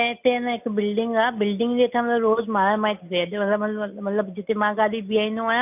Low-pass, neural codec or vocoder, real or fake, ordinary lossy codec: 3.6 kHz; none; real; none